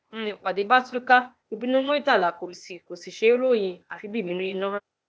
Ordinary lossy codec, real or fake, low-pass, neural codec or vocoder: none; fake; none; codec, 16 kHz, 0.8 kbps, ZipCodec